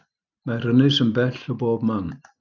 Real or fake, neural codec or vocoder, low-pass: real; none; 7.2 kHz